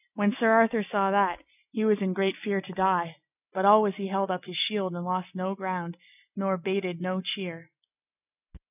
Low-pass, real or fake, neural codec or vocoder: 3.6 kHz; real; none